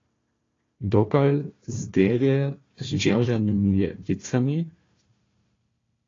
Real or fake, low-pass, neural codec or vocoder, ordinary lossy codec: fake; 7.2 kHz; codec, 16 kHz, 1 kbps, FunCodec, trained on Chinese and English, 50 frames a second; AAC, 32 kbps